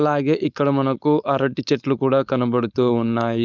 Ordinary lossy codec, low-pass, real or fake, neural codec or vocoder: none; 7.2 kHz; fake; codec, 16 kHz, 4.8 kbps, FACodec